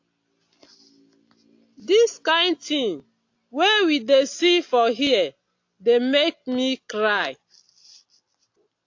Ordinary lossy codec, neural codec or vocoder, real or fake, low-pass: AAC, 48 kbps; none; real; 7.2 kHz